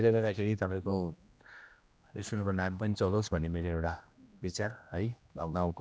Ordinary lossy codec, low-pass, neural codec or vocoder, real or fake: none; none; codec, 16 kHz, 1 kbps, X-Codec, HuBERT features, trained on general audio; fake